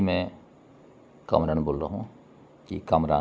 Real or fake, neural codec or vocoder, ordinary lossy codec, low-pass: real; none; none; none